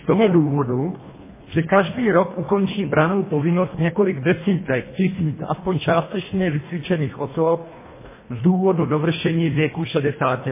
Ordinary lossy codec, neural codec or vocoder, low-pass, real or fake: MP3, 16 kbps; codec, 24 kHz, 1.5 kbps, HILCodec; 3.6 kHz; fake